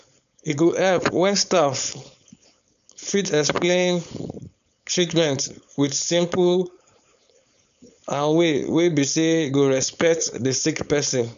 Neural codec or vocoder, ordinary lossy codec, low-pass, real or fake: codec, 16 kHz, 4.8 kbps, FACodec; none; 7.2 kHz; fake